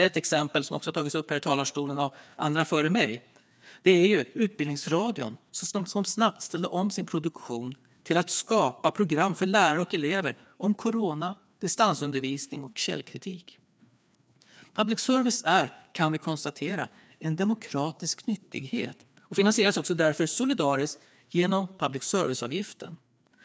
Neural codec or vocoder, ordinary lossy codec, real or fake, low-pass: codec, 16 kHz, 2 kbps, FreqCodec, larger model; none; fake; none